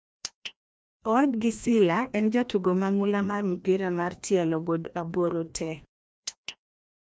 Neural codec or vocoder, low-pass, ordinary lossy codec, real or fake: codec, 16 kHz, 1 kbps, FreqCodec, larger model; none; none; fake